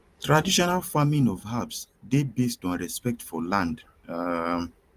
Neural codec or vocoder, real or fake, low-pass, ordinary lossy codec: vocoder, 44.1 kHz, 128 mel bands every 512 samples, BigVGAN v2; fake; 14.4 kHz; Opus, 32 kbps